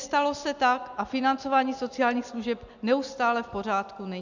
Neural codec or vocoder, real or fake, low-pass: none; real; 7.2 kHz